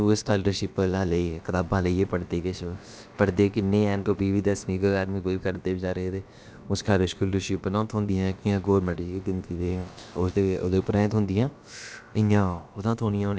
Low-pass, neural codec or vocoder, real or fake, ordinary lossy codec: none; codec, 16 kHz, about 1 kbps, DyCAST, with the encoder's durations; fake; none